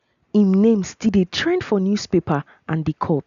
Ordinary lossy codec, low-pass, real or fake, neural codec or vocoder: none; 7.2 kHz; real; none